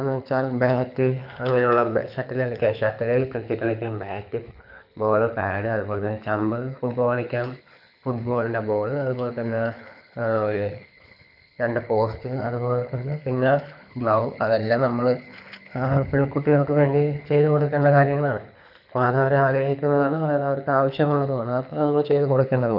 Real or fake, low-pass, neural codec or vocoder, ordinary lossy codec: fake; 5.4 kHz; codec, 24 kHz, 6 kbps, HILCodec; none